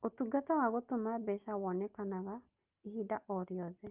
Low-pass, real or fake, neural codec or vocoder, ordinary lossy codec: 3.6 kHz; real; none; Opus, 24 kbps